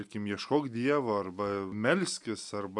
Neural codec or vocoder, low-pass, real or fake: none; 10.8 kHz; real